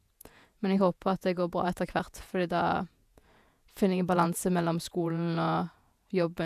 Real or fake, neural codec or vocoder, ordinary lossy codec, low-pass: fake; vocoder, 48 kHz, 128 mel bands, Vocos; AAC, 96 kbps; 14.4 kHz